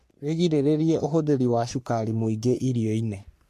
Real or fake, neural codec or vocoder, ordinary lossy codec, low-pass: fake; codec, 44.1 kHz, 3.4 kbps, Pupu-Codec; MP3, 64 kbps; 14.4 kHz